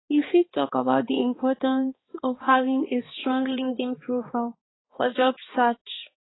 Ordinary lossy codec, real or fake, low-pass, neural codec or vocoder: AAC, 16 kbps; fake; 7.2 kHz; codec, 16 kHz, 2 kbps, X-Codec, HuBERT features, trained on balanced general audio